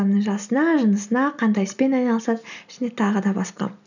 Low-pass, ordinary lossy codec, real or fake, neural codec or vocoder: 7.2 kHz; none; real; none